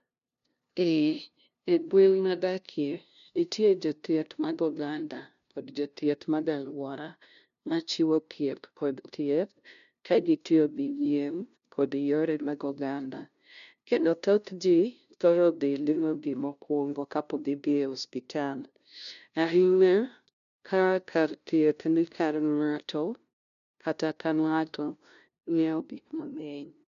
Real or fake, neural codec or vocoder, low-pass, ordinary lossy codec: fake; codec, 16 kHz, 0.5 kbps, FunCodec, trained on LibriTTS, 25 frames a second; 7.2 kHz; none